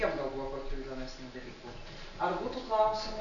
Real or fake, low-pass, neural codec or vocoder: real; 7.2 kHz; none